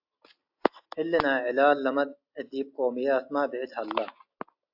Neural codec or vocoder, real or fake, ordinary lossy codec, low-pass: none; real; AAC, 48 kbps; 5.4 kHz